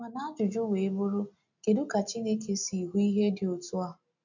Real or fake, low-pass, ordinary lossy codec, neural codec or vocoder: real; 7.2 kHz; none; none